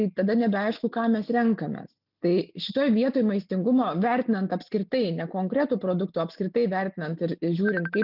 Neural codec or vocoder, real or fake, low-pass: none; real; 5.4 kHz